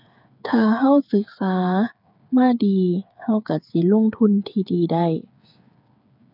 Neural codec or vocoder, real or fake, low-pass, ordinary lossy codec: codec, 16 kHz, 8 kbps, FreqCodec, smaller model; fake; 5.4 kHz; none